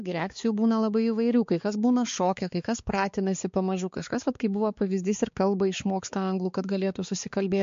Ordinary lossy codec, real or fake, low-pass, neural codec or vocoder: MP3, 48 kbps; fake; 7.2 kHz; codec, 16 kHz, 4 kbps, X-Codec, HuBERT features, trained on balanced general audio